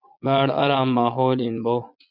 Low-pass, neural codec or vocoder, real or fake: 5.4 kHz; vocoder, 22.05 kHz, 80 mel bands, Vocos; fake